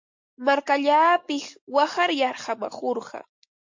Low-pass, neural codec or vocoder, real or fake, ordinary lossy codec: 7.2 kHz; none; real; MP3, 48 kbps